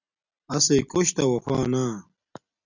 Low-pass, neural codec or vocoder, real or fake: 7.2 kHz; none; real